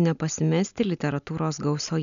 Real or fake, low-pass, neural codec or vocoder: real; 7.2 kHz; none